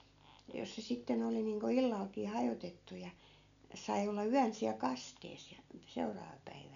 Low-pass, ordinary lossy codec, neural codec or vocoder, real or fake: 7.2 kHz; none; none; real